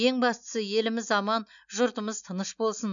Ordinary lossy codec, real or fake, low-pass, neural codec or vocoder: none; real; 7.2 kHz; none